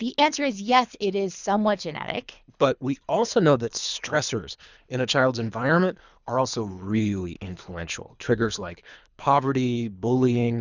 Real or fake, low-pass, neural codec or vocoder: fake; 7.2 kHz; codec, 24 kHz, 3 kbps, HILCodec